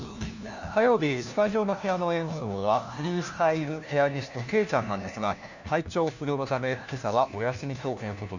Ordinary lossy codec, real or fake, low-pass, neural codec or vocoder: none; fake; 7.2 kHz; codec, 16 kHz, 1 kbps, FunCodec, trained on LibriTTS, 50 frames a second